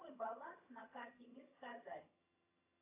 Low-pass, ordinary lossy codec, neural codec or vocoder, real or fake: 3.6 kHz; AAC, 32 kbps; vocoder, 22.05 kHz, 80 mel bands, HiFi-GAN; fake